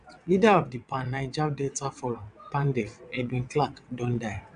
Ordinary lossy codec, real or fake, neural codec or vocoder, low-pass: AAC, 96 kbps; fake; vocoder, 22.05 kHz, 80 mel bands, WaveNeXt; 9.9 kHz